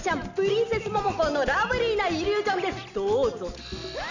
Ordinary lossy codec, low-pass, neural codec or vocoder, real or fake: none; 7.2 kHz; none; real